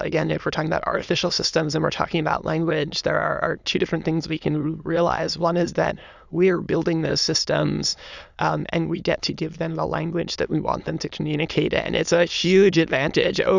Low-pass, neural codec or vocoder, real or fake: 7.2 kHz; autoencoder, 22.05 kHz, a latent of 192 numbers a frame, VITS, trained on many speakers; fake